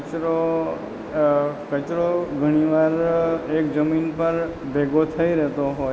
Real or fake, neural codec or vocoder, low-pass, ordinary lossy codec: real; none; none; none